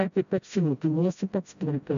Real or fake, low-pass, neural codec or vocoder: fake; 7.2 kHz; codec, 16 kHz, 0.5 kbps, FreqCodec, smaller model